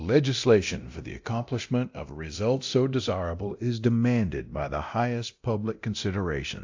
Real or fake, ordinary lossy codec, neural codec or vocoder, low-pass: fake; AAC, 48 kbps; codec, 24 kHz, 0.9 kbps, DualCodec; 7.2 kHz